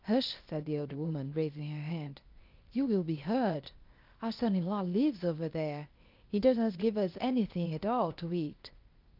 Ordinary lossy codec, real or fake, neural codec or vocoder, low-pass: Opus, 32 kbps; fake; codec, 16 kHz, 0.8 kbps, ZipCodec; 5.4 kHz